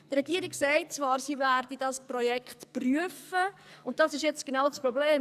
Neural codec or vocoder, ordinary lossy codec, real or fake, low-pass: codec, 44.1 kHz, 2.6 kbps, SNAC; none; fake; 14.4 kHz